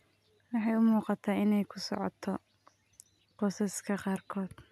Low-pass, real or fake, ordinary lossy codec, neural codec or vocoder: 14.4 kHz; real; none; none